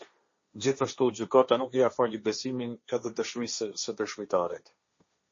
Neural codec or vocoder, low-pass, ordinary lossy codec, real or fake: codec, 16 kHz, 1.1 kbps, Voila-Tokenizer; 7.2 kHz; MP3, 32 kbps; fake